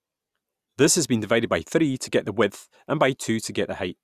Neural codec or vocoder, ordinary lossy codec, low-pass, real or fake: none; Opus, 64 kbps; 14.4 kHz; real